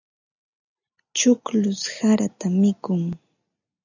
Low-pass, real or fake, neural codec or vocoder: 7.2 kHz; real; none